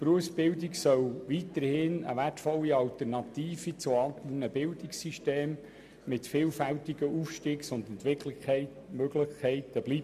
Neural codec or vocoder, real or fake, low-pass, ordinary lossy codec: none; real; 14.4 kHz; MP3, 96 kbps